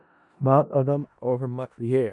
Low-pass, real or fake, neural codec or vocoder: 10.8 kHz; fake; codec, 16 kHz in and 24 kHz out, 0.4 kbps, LongCat-Audio-Codec, four codebook decoder